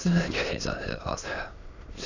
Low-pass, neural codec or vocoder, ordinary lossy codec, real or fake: 7.2 kHz; autoencoder, 22.05 kHz, a latent of 192 numbers a frame, VITS, trained on many speakers; none; fake